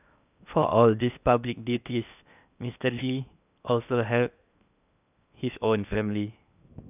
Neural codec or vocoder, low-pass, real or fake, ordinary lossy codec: codec, 16 kHz in and 24 kHz out, 0.8 kbps, FocalCodec, streaming, 65536 codes; 3.6 kHz; fake; none